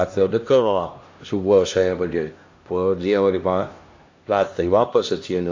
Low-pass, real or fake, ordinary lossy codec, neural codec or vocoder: 7.2 kHz; fake; AAC, 48 kbps; codec, 16 kHz, 1 kbps, X-Codec, HuBERT features, trained on LibriSpeech